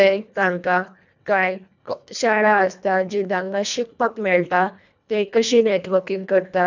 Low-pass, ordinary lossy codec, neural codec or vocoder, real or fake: 7.2 kHz; none; codec, 24 kHz, 1.5 kbps, HILCodec; fake